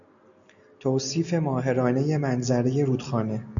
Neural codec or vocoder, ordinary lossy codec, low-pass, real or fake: none; MP3, 96 kbps; 7.2 kHz; real